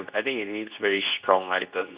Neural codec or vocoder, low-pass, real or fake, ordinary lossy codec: codec, 24 kHz, 0.9 kbps, WavTokenizer, medium speech release version 2; 3.6 kHz; fake; Opus, 64 kbps